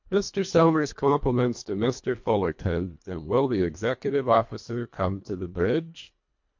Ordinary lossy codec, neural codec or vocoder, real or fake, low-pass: MP3, 48 kbps; codec, 24 kHz, 1.5 kbps, HILCodec; fake; 7.2 kHz